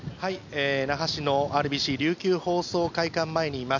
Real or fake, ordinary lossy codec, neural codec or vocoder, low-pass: real; none; none; 7.2 kHz